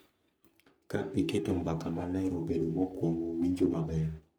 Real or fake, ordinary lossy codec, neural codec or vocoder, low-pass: fake; none; codec, 44.1 kHz, 3.4 kbps, Pupu-Codec; none